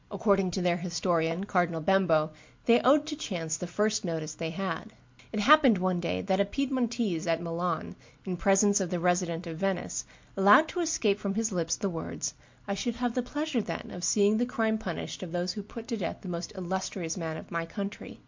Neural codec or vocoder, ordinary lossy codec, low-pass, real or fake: none; MP3, 48 kbps; 7.2 kHz; real